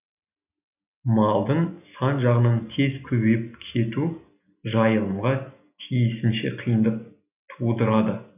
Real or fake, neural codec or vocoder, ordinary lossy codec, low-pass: real; none; none; 3.6 kHz